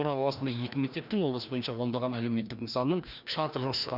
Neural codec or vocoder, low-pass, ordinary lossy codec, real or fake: codec, 16 kHz, 1 kbps, FreqCodec, larger model; 5.4 kHz; none; fake